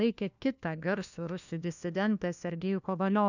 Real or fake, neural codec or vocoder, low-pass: fake; codec, 16 kHz, 1 kbps, FunCodec, trained on LibriTTS, 50 frames a second; 7.2 kHz